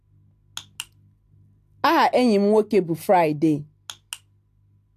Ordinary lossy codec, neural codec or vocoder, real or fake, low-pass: AAC, 64 kbps; none; real; 14.4 kHz